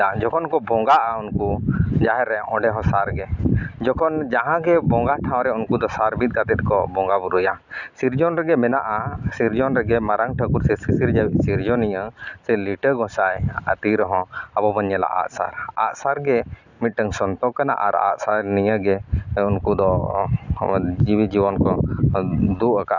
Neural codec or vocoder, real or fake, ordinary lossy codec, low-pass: none; real; none; 7.2 kHz